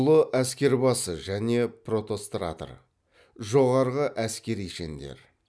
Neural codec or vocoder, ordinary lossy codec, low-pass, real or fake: none; none; none; real